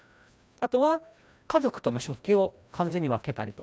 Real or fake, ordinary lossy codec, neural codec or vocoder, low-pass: fake; none; codec, 16 kHz, 1 kbps, FreqCodec, larger model; none